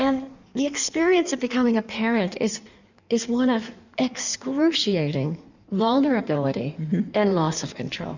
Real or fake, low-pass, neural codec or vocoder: fake; 7.2 kHz; codec, 16 kHz in and 24 kHz out, 1.1 kbps, FireRedTTS-2 codec